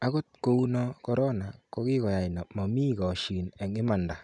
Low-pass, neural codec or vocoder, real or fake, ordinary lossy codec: 10.8 kHz; none; real; none